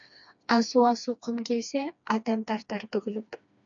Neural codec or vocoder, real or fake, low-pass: codec, 16 kHz, 2 kbps, FreqCodec, smaller model; fake; 7.2 kHz